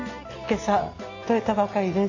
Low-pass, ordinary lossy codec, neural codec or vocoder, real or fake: 7.2 kHz; AAC, 48 kbps; none; real